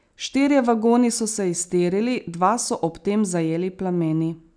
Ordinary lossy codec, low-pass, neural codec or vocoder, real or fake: none; 9.9 kHz; none; real